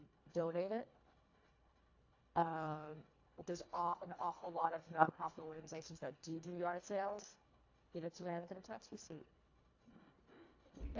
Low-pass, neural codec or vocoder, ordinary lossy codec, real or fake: 7.2 kHz; codec, 24 kHz, 1.5 kbps, HILCodec; AAC, 48 kbps; fake